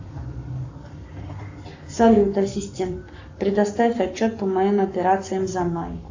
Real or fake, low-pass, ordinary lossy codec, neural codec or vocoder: fake; 7.2 kHz; AAC, 48 kbps; codec, 44.1 kHz, 7.8 kbps, Pupu-Codec